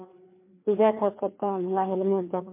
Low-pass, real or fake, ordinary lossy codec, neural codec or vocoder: 3.6 kHz; fake; MP3, 24 kbps; codec, 16 kHz, 2 kbps, FreqCodec, larger model